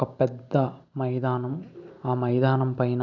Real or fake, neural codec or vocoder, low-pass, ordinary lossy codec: real; none; 7.2 kHz; none